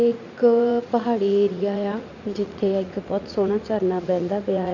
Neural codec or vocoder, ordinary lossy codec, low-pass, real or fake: vocoder, 44.1 kHz, 80 mel bands, Vocos; none; 7.2 kHz; fake